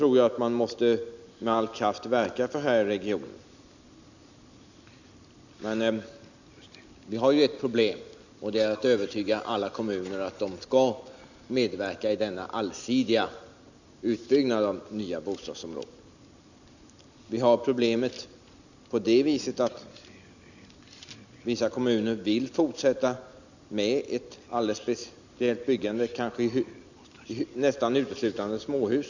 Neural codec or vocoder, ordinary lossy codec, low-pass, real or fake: none; none; 7.2 kHz; real